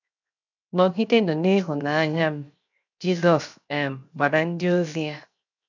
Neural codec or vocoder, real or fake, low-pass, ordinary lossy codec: codec, 16 kHz, 0.7 kbps, FocalCodec; fake; 7.2 kHz; none